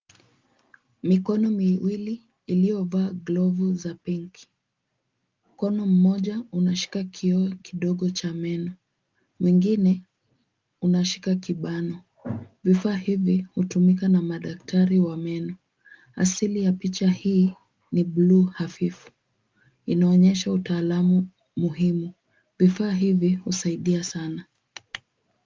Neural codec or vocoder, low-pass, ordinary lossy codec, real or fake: none; 7.2 kHz; Opus, 24 kbps; real